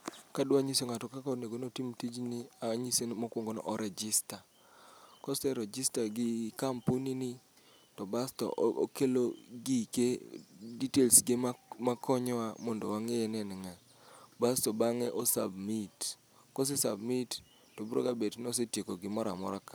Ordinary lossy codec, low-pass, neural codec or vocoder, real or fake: none; none; none; real